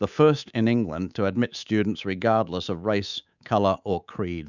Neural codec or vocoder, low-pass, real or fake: codec, 24 kHz, 3.1 kbps, DualCodec; 7.2 kHz; fake